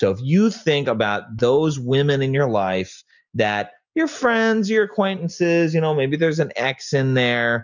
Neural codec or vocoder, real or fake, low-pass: none; real; 7.2 kHz